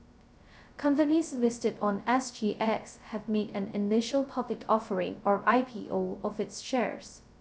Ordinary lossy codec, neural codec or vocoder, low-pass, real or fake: none; codec, 16 kHz, 0.2 kbps, FocalCodec; none; fake